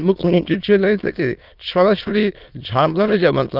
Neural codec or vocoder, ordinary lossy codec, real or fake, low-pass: autoencoder, 22.05 kHz, a latent of 192 numbers a frame, VITS, trained on many speakers; Opus, 32 kbps; fake; 5.4 kHz